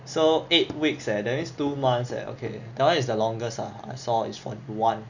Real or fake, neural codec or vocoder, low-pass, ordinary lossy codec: real; none; 7.2 kHz; none